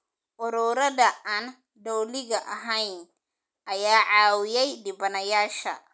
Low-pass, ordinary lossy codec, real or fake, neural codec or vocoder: none; none; real; none